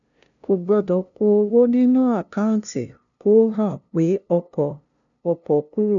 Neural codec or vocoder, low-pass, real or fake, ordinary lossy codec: codec, 16 kHz, 0.5 kbps, FunCodec, trained on LibriTTS, 25 frames a second; 7.2 kHz; fake; none